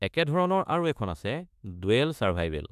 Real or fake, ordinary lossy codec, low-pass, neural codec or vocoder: fake; none; 14.4 kHz; autoencoder, 48 kHz, 32 numbers a frame, DAC-VAE, trained on Japanese speech